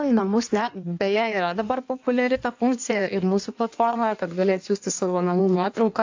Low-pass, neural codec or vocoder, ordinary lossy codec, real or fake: 7.2 kHz; codec, 16 kHz in and 24 kHz out, 1.1 kbps, FireRedTTS-2 codec; AAC, 48 kbps; fake